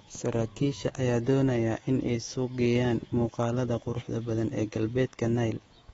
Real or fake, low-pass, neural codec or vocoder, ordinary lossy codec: fake; 10.8 kHz; codec, 24 kHz, 3.1 kbps, DualCodec; AAC, 24 kbps